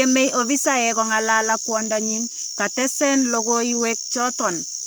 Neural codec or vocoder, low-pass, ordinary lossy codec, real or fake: codec, 44.1 kHz, 7.8 kbps, Pupu-Codec; none; none; fake